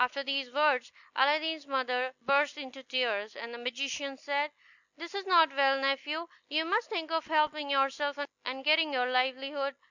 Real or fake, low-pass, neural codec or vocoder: real; 7.2 kHz; none